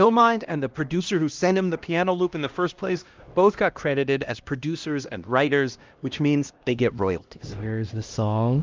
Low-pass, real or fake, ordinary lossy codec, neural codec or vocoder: 7.2 kHz; fake; Opus, 32 kbps; codec, 16 kHz, 1 kbps, X-Codec, HuBERT features, trained on LibriSpeech